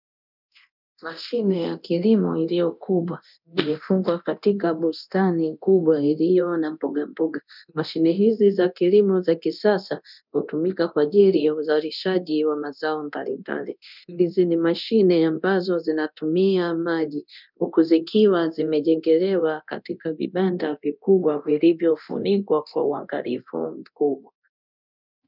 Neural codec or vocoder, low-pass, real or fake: codec, 24 kHz, 0.9 kbps, DualCodec; 5.4 kHz; fake